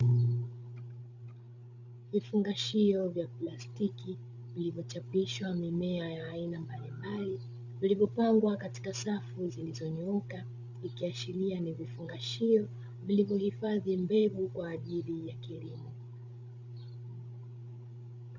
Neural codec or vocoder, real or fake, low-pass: codec, 16 kHz, 16 kbps, FreqCodec, larger model; fake; 7.2 kHz